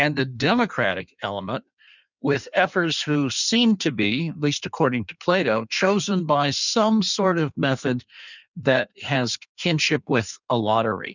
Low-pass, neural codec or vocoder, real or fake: 7.2 kHz; codec, 16 kHz in and 24 kHz out, 1.1 kbps, FireRedTTS-2 codec; fake